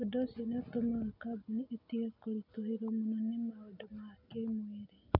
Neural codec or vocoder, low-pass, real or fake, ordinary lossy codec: none; 5.4 kHz; real; none